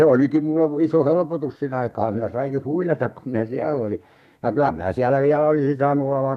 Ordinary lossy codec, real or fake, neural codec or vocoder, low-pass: none; fake; codec, 32 kHz, 1.9 kbps, SNAC; 14.4 kHz